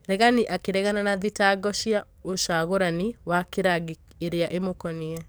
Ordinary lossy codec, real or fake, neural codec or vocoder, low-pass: none; fake; codec, 44.1 kHz, 7.8 kbps, DAC; none